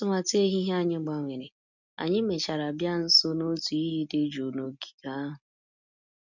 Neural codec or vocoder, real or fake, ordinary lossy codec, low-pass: none; real; none; 7.2 kHz